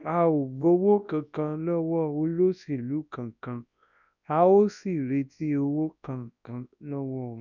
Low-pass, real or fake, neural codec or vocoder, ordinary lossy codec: 7.2 kHz; fake; codec, 24 kHz, 0.9 kbps, WavTokenizer, large speech release; none